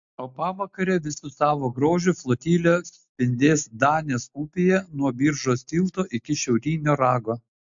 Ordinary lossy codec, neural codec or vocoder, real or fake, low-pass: MP3, 64 kbps; none; real; 7.2 kHz